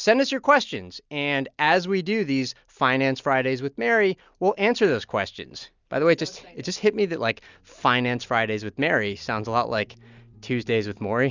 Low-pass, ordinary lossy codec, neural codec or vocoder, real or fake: 7.2 kHz; Opus, 64 kbps; none; real